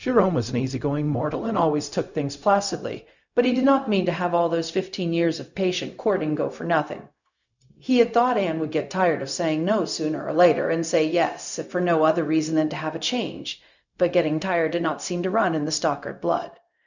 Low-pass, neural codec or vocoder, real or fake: 7.2 kHz; codec, 16 kHz, 0.4 kbps, LongCat-Audio-Codec; fake